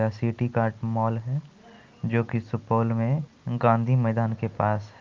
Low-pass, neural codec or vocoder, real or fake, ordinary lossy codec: 7.2 kHz; none; real; Opus, 24 kbps